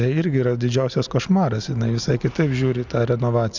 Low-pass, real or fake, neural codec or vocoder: 7.2 kHz; real; none